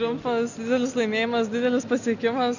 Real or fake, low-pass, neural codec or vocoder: real; 7.2 kHz; none